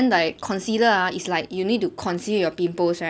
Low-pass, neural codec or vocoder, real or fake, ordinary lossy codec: none; none; real; none